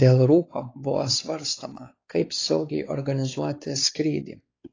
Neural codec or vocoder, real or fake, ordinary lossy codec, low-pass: codec, 16 kHz, 4 kbps, X-Codec, WavLM features, trained on Multilingual LibriSpeech; fake; AAC, 32 kbps; 7.2 kHz